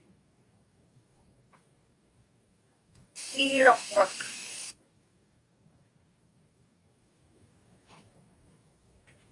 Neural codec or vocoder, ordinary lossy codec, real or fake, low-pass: codec, 44.1 kHz, 2.6 kbps, DAC; Opus, 64 kbps; fake; 10.8 kHz